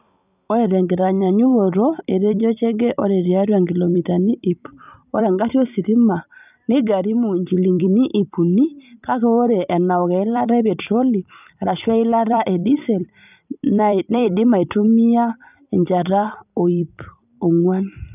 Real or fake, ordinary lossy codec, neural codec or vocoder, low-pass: fake; none; vocoder, 44.1 kHz, 128 mel bands every 256 samples, BigVGAN v2; 3.6 kHz